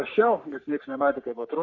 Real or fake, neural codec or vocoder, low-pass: fake; codec, 44.1 kHz, 3.4 kbps, Pupu-Codec; 7.2 kHz